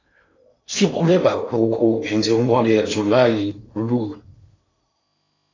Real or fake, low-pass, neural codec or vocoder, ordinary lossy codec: fake; 7.2 kHz; codec, 16 kHz in and 24 kHz out, 0.8 kbps, FocalCodec, streaming, 65536 codes; AAC, 32 kbps